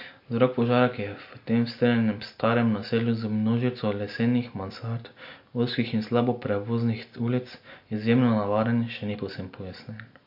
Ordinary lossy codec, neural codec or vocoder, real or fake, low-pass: MP3, 32 kbps; none; real; 5.4 kHz